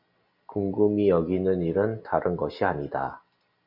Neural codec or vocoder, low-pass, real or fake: none; 5.4 kHz; real